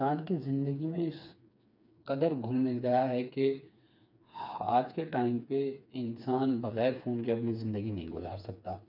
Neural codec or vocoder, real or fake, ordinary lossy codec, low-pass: codec, 16 kHz, 4 kbps, FreqCodec, smaller model; fake; none; 5.4 kHz